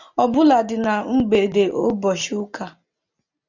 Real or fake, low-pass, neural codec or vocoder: real; 7.2 kHz; none